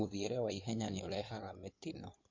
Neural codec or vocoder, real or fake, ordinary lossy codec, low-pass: codec, 16 kHz in and 24 kHz out, 2.2 kbps, FireRedTTS-2 codec; fake; MP3, 48 kbps; 7.2 kHz